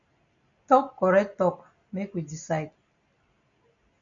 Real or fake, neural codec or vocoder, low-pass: real; none; 7.2 kHz